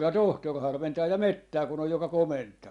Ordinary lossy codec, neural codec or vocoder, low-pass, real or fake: Opus, 64 kbps; none; 10.8 kHz; real